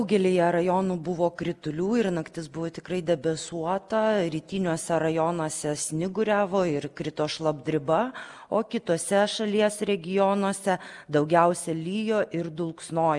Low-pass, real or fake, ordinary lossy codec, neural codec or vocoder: 10.8 kHz; real; Opus, 32 kbps; none